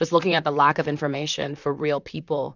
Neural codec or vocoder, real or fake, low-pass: vocoder, 44.1 kHz, 128 mel bands, Pupu-Vocoder; fake; 7.2 kHz